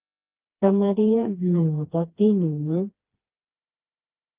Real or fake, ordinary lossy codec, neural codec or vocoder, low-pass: fake; Opus, 32 kbps; codec, 16 kHz, 2 kbps, FreqCodec, smaller model; 3.6 kHz